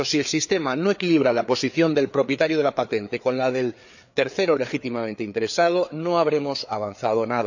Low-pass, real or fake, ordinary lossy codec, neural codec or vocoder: 7.2 kHz; fake; none; codec, 16 kHz, 4 kbps, FreqCodec, larger model